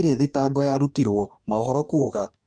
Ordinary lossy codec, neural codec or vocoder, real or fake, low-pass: none; codec, 44.1 kHz, 2.6 kbps, DAC; fake; 9.9 kHz